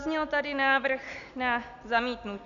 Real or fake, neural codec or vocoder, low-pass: real; none; 7.2 kHz